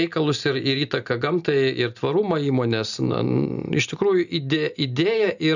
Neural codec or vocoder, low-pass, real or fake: none; 7.2 kHz; real